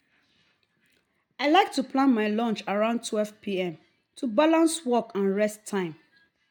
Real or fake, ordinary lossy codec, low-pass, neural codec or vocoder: real; MP3, 96 kbps; 19.8 kHz; none